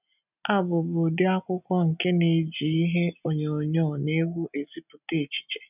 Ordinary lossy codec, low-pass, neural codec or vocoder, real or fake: none; 3.6 kHz; none; real